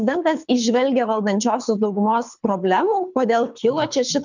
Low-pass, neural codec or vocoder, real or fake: 7.2 kHz; codec, 24 kHz, 6 kbps, HILCodec; fake